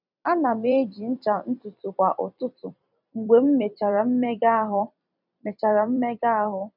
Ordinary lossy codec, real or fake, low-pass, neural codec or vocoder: none; fake; 5.4 kHz; vocoder, 44.1 kHz, 128 mel bands every 256 samples, BigVGAN v2